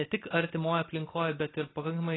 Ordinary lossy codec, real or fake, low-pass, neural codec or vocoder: AAC, 16 kbps; real; 7.2 kHz; none